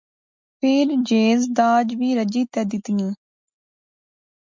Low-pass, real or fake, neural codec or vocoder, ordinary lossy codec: 7.2 kHz; real; none; MP3, 64 kbps